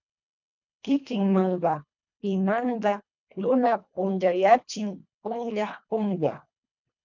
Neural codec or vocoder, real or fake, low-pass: codec, 24 kHz, 1.5 kbps, HILCodec; fake; 7.2 kHz